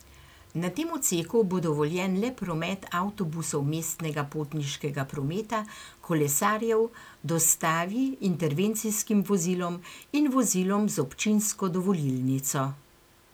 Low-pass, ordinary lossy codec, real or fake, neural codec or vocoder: none; none; real; none